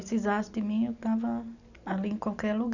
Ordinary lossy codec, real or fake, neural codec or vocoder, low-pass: none; real; none; 7.2 kHz